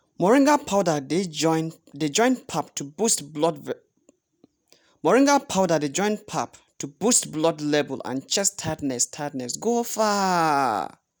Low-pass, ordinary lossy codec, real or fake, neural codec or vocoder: none; none; real; none